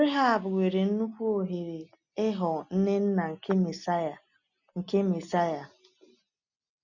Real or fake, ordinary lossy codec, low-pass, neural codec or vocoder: real; none; 7.2 kHz; none